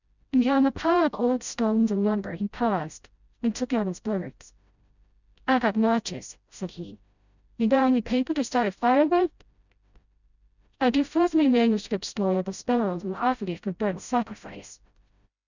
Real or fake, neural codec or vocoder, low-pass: fake; codec, 16 kHz, 0.5 kbps, FreqCodec, smaller model; 7.2 kHz